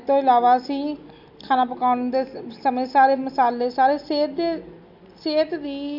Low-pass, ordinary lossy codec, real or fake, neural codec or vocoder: 5.4 kHz; none; real; none